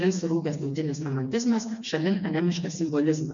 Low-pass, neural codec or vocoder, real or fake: 7.2 kHz; codec, 16 kHz, 2 kbps, FreqCodec, smaller model; fake